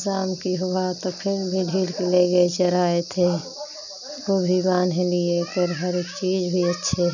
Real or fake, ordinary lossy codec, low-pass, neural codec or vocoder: real; none; 7.2 kHz; none